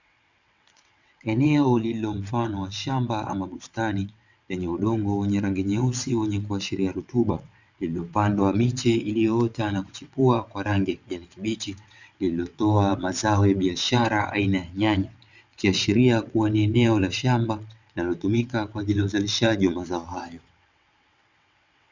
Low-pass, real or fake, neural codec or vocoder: 7.2 kHz; fake; vocoder, 22.05 kHz, 80 mel bands, WaveNeXt